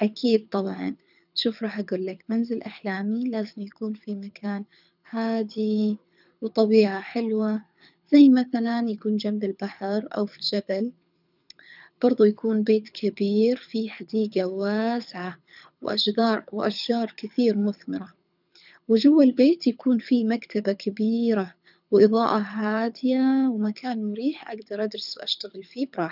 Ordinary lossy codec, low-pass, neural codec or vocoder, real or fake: none; 5.4 kHz; codec, 24 kHz, 6 kbps, HILCodec; fake